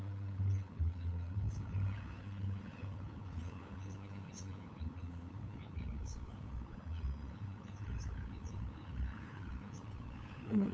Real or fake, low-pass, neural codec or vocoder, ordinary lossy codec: fake; none; codec, 16 kHz, 8 kbps, FunCodec, trained on LibriTTS, 25 frames a second; none